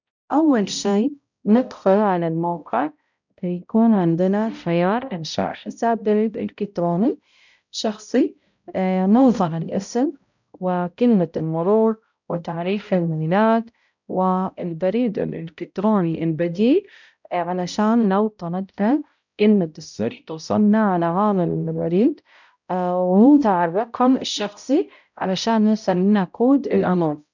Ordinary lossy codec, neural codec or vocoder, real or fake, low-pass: none; codec, 16 kHz, 0.5 kbps, X-Codec, HuBERT features, trained on balanced general audio; fake; 7.2 kHz